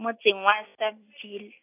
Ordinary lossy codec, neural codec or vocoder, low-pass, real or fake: AAC, 24 kbps; none; 3.6 kHz; real